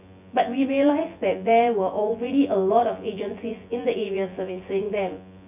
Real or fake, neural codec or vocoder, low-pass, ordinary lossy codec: fake; vocoder, 24 kHz, 100 mel bands, Vocos; 3.6 kHz; none